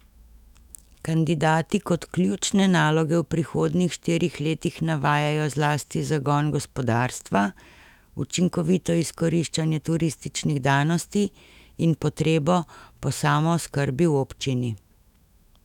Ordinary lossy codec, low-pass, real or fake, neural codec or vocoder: none; 19.8 kHz; fake; autoencoder, 48 kHz, 128 numbers a frame, DAC-VAE, trained on Japanese speech